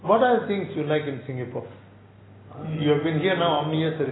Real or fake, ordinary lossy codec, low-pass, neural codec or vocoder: real; AAC, 16 kbps; 7.2 kHz; none